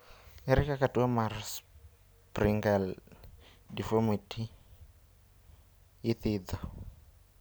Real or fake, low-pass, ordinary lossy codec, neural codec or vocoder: real; none; none; none